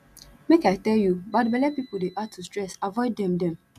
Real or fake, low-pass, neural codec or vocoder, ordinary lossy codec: real; 14.4 kHz; none; none